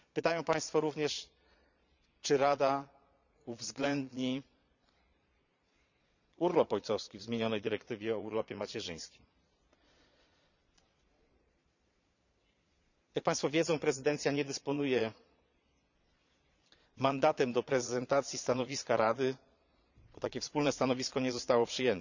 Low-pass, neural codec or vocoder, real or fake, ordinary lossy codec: 7.2 kHz; vocoder, 22.05 kHz, 80 mel bands, Vocos; fake; none